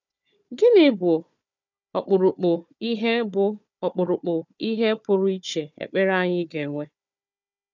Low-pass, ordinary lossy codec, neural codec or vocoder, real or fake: 7.2 kHz; none; codec, 16 kHz, 4 kbps, FunCodec, trained on Chinese and English, 50 frames a second; fake